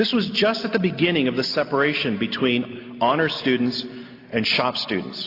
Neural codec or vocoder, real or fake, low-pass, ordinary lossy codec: none; real; 5.4 kHz; AAC, 32 kbps